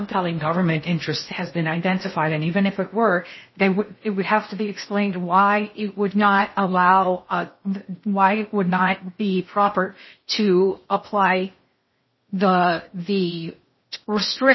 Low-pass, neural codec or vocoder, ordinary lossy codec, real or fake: 7.2 kHz; codec, 16 kHz in and 24 kHz out, 0.6 kbps, FocalCodec, streaming, 4096 codes; MP3, 24 kbps; fake